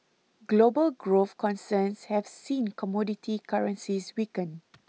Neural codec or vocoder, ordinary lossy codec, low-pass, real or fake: none; none; none; real